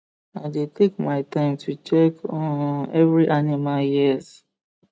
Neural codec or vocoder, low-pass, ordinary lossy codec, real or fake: none; none; none; real